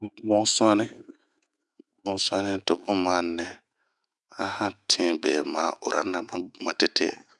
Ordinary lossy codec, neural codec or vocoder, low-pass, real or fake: Opus, 64 kbps; autoencoder, 48 kHz, 128 numbers a frame, DAC-VAE, trained on Japanese speech; 10.8 kHz; fake